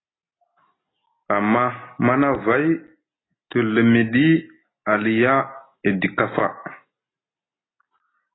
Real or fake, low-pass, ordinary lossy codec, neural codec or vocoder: real; 7.2 kHz; AAC, 16 kbps; none